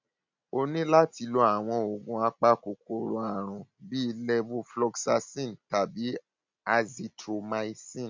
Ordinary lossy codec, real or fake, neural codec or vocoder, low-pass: MP3, 64 kbps; real; none; 7.2 kHz